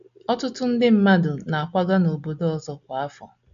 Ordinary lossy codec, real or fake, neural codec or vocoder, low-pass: MP3, 64 kbps; real; none; 7.2 kHz